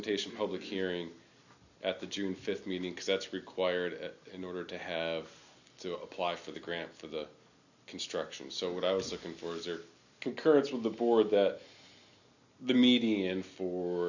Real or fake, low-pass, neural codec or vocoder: real; 7.2 kHz; none